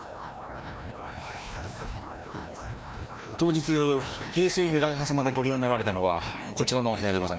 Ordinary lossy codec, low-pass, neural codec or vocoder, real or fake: none; none; codec, 16 kHz, 1 kbps, FreqCodec, larger model; fake